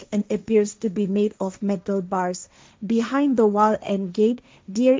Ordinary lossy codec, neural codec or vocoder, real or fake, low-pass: none; codec, 16 kHz, 1.1 kbps, Voila-Tokenizer; fake; none